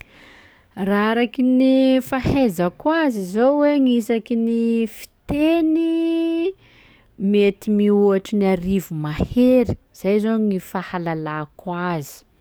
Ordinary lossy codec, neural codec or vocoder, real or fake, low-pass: none; codec, 44.1 kHz, 7.8 kbps, DAC; fake; none